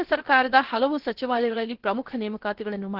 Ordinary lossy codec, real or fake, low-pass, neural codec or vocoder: Opus, 16 kbps; fake; 5.4 kHz; codec, 16 kHz, 0.7 kbps, FocalCodec